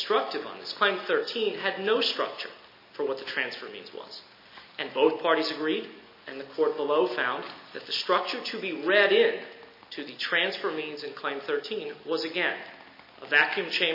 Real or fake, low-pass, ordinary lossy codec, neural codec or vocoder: real; 5.4 kHz; MP3, 24 kbps; none